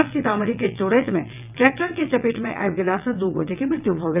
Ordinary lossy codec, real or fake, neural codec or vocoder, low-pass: none; fake; vocoder, 22.05 kHz, 80 mel bands, WaveNeXt; 3.6 kHz